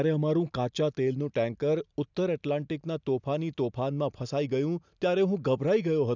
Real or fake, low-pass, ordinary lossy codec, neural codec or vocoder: real; 7.2 kHz; none; none